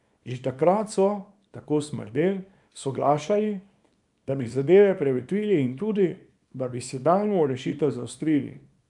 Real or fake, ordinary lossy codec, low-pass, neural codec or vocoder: fake; none; 10.8 kHz; codec, 24 kHz, 0.9 kbps, WavTokenizer, small release